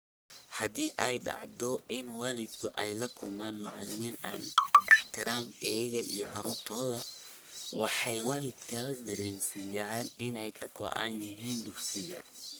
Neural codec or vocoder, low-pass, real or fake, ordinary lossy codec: codec, 44.1 kHz, 1.7 kbps, Pupu-Codec; none; fake; none